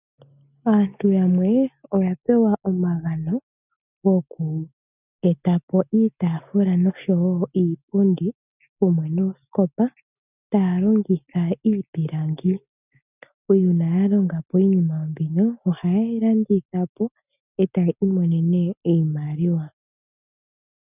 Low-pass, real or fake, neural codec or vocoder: 3.6 kHz; real; none